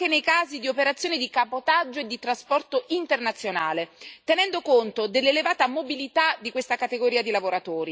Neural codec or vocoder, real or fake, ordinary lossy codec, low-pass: none; real; none; none